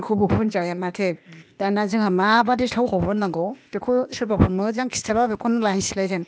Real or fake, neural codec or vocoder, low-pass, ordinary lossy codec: fake; codec, 16 kHz, 0.8 kbps, ZipCodec; none; none